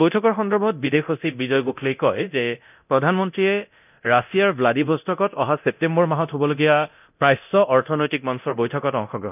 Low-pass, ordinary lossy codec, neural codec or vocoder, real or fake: 3.6 kHz; none; codec, 24 kHz, 0.9 kbps, DualCodec; fake